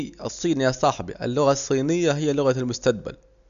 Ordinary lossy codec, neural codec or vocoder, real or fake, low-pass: none; none; real; 7.2 kHz